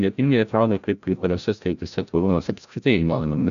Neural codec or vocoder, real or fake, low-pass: codec, 16 kHz, 0.5 kbps, FreqCodec, larger model; fake; 7.2 kHz